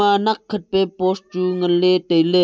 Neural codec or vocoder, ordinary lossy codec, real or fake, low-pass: none; none; real; none